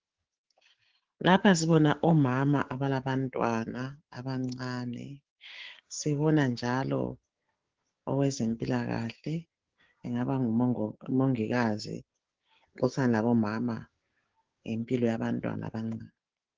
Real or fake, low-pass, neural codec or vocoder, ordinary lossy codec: fake; 7.2 kHz; codec, 16 kHz, 6 kbps, DAC; Opus, 16 kbps